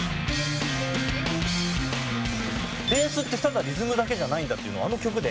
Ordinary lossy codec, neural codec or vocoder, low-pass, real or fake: none; none; none; real